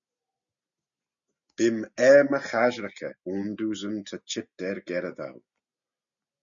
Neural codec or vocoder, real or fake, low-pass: none; real; 7.2 kHz